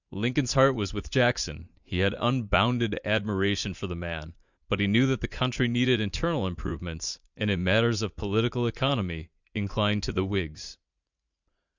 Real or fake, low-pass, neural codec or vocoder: fake; 7.2 kHz; vocoder, 44.1 kHz, 80 mel bands, Vocos